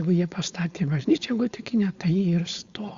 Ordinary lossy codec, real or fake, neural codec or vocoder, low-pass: Opus, 64 kbps; fake; codec, 16 kHz, 4.8 kbps, FACodec; 7.2 kHz